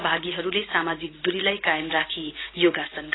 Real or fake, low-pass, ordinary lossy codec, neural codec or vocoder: real; 7.2 kHz; AAC, 16 kbps; none